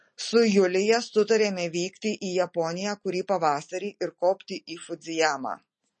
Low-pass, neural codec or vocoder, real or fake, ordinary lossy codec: 10.8 kHz; none; real; MP3, 32 kbps